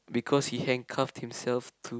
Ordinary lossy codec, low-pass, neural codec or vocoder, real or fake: none; none; none; real